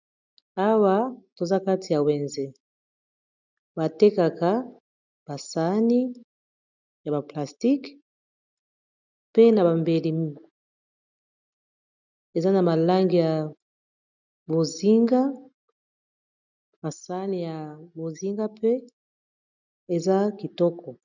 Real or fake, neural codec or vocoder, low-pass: real; none; 7.2 kHz